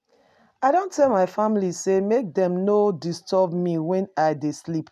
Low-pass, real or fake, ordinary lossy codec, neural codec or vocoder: 14.4 kHz; real; none; none